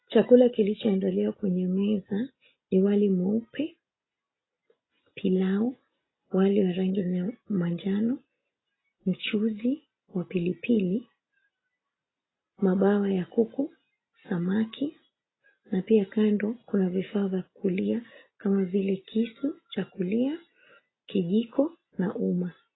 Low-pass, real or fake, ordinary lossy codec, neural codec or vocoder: 7.2 kHz; real; AAC, 16 kbps; none